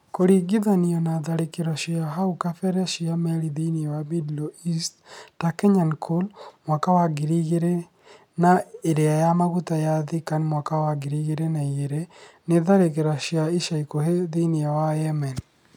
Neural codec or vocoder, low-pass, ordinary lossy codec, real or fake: none; 19.8 kHz; none; real